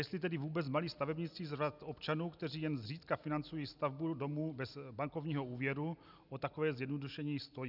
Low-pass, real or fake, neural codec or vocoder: 5.4 kHz; real; none